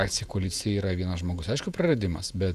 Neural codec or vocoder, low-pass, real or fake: vocoder, 48 kHz, 128 mel bands, Vocos; 14.4 kHz; fake